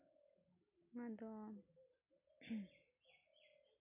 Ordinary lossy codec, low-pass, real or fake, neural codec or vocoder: none; 3.6 kHz; real; none